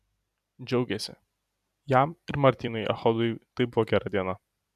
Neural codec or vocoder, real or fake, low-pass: vocoder, 44.1 kHz, 128 mel bands every 256 samples, BigVGAN v2; fake; 14.4 kHz